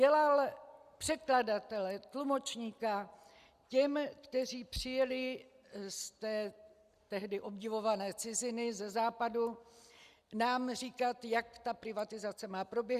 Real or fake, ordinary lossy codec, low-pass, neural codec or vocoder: real; Opus, 64 kbps; 14.4 kHz; none